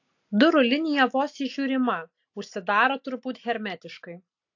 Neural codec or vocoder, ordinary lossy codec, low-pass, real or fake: none; AAC, 48 kbps; 7.2 kHz; real